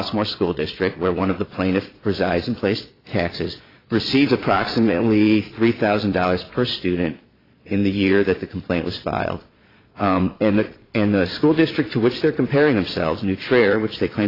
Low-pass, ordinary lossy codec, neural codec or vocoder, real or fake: 5.4 kHz; AAC, 24 kbps; vocoder, 22.05 kHz, 80 mel bands, Vocos; fake